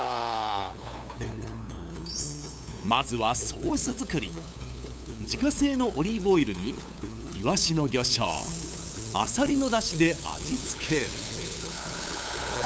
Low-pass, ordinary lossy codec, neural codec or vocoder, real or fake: none; none; codec, 16 kHz, 8 kbps, FunCodec, trained on LibriTTS, 25 frames a second; fake